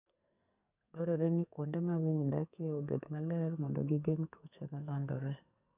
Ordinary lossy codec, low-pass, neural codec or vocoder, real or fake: none; 3.6 kHz; codec, 44.1 kHz, 2.6 kbps, SNAC; fake